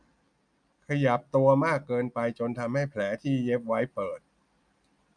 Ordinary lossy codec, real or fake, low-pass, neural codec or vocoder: none; real; 9.9 kHz; none